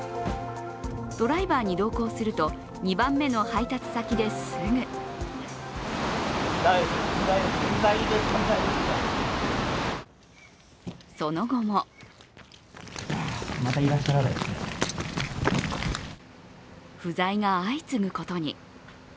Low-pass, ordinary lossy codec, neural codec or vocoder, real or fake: none; none; none; real